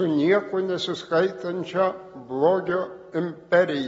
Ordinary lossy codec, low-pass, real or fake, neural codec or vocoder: AAC, 24 kbps; 19.8 kHz; real; none